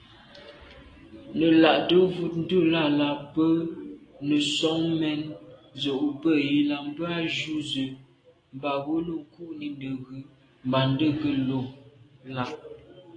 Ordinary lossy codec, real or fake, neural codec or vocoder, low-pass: AAC, 32 kbps; real; none; 9.9 kHz